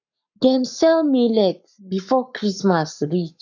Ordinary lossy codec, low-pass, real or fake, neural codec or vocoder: none; 7.2 kHz; fake; codec, 44.1 kHz, 7.8 kbps, Pupu-Codec